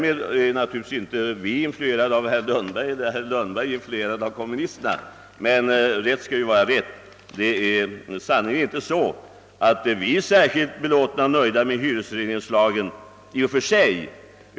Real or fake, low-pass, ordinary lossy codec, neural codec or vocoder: real; none; none; none